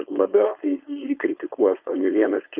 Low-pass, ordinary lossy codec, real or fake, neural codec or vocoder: 3.6 kHz; Opus, 24 kbps; fake; codec, 16 kHz, 4.8 kbps, FACodec